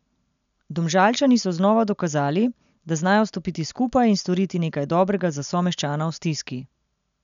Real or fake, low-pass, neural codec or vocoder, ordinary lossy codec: real; 7.2 kHz; none; none